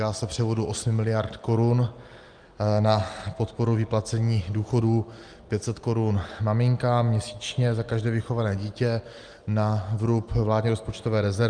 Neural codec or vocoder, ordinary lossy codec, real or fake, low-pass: none; Opus, 32 kbps; real; 9.9 kHz